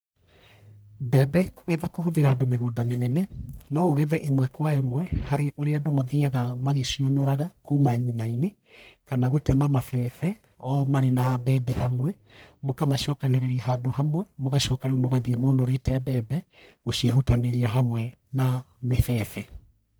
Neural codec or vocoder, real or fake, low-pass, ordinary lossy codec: codec, 44.1 kHz, 1.7 kbps, Pupu-Codec; fake; none; none